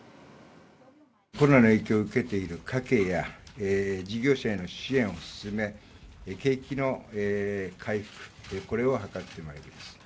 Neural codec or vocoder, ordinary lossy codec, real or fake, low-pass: none; none; real; none